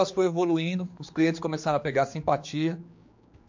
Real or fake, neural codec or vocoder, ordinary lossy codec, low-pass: fake; codec, 16 kHz, 4 kbps, X-Codec, HuBERT features, trained on general audio; MP3, 48 kbps; 7.2 kHz